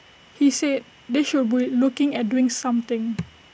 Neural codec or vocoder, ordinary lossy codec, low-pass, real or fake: none; none; none; real